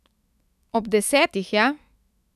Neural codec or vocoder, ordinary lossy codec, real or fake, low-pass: autoencoder, 48 kHz, 128 numbers a frame, DAC-VAE, trained on Japanese speech; none; fake; 14.4 kHz